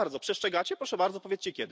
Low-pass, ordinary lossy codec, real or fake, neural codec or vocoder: none; none; real; none